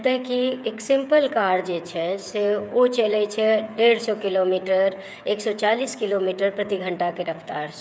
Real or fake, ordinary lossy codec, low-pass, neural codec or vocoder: fake; none; none; codec, 16 kHz, 8 kbps, FreqCodec, smaller model